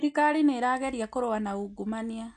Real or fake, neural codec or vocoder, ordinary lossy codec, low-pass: real; none; MP3, 64 kbps; 9.9 kHz